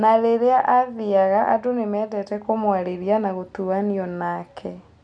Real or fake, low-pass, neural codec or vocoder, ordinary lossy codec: real; 9.9 kHz; none; none